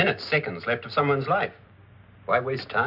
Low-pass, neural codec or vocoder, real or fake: 5.4 kHz; none; real